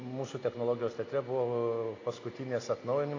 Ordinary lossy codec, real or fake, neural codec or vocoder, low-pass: AAC, 32 kbps; real; none; 7.2 kHz